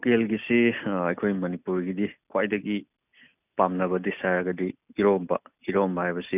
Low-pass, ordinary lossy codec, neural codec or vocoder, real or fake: 3.6 kHz; none; none; real